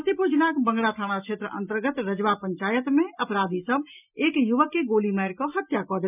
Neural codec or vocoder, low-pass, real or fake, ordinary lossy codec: none; 3.6 kHz; real; none